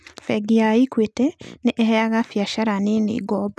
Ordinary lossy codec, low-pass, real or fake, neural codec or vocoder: none; none; real; none